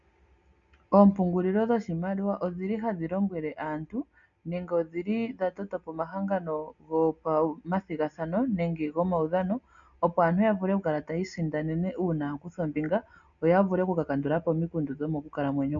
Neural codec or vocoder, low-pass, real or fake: none; 7.2 kHz; real